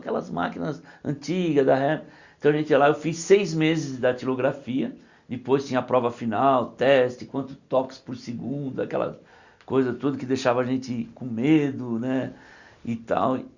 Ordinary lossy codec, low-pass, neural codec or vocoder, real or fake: Opus, 64 kbps; 7.2 kHz; none; real